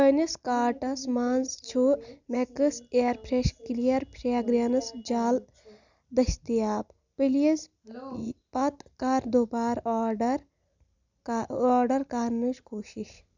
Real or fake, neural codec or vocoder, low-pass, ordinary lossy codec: real; none; 7.2 kHz; none